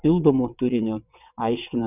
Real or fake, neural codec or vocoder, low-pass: fake; vocoder, 22.05 kHz, 80 mel bands, WaveNeXt; 3.6 kHz